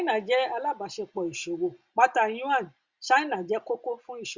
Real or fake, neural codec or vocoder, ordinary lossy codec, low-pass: real; none; Opus, 64 kbps; 7.2 kHz